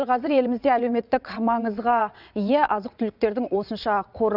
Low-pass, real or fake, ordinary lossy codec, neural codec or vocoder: 5.4 kHz; fake; none; vocoder, 22.05 kHz, 80 mel bands, WaveNeXt